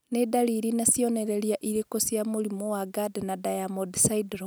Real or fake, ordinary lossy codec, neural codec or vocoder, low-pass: real; none; none; none